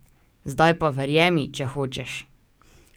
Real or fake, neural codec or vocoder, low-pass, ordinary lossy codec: fake; codec, 44.1 kHz, 7.8 kbps, DAC; none; none